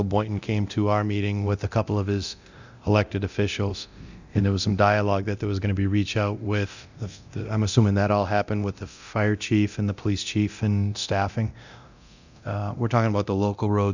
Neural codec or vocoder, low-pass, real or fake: codec, 24 kHz, 0.9 kbps, DualCodec; 7.2 kHz; fake